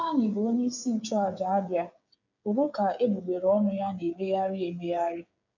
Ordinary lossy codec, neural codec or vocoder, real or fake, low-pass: none; codec, 16 kHz, 8 kbps, FreqCodec, smaller model; fake; 7.2 kHz